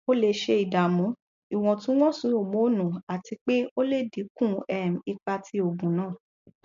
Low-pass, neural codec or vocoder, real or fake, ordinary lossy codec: 7.2 kHz; none; real; MP3, 48 kbps